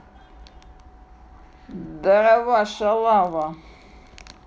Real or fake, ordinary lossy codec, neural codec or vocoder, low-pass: real; none; none; none